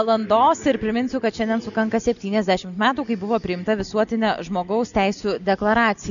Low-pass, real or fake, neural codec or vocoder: 7.2 kHz; real; none